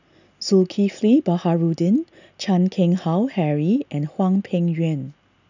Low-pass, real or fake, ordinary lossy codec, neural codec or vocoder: 7.2 kHz; real; none; none